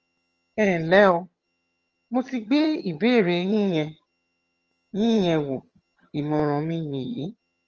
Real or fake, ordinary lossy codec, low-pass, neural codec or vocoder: fake; Opus, 24 kbps; 7.2 kHz; vocoder, 22.05 kHz, 80 mel bands, HiFi-GAN